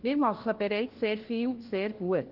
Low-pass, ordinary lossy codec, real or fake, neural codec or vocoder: 5.4 kHz; Opus, 16 kbps; fake; codec, 16 kHz, 1 kbps, FunCodec, trained on LibriTTS, 50 frames a second